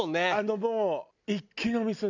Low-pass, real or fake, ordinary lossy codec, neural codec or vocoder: 7.2 kHz; real; none; none